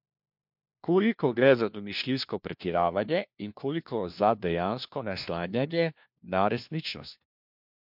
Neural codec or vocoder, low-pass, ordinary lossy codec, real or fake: codec, 16 kHz, 1 kbps, FunCodec, trained on LibriTTS, 50 frames a second; 5.4 kHz; MP3, 48 kbps; fake